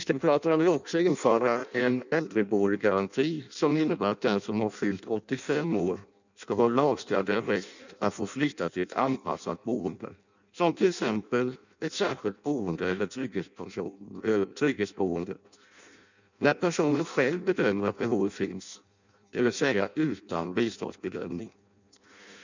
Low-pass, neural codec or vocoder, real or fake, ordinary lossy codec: 7.2 kHz; codec, 16 kHz in and 24 kHz out, 0.6 kbps, FireRedTTS-2 codec; fake; none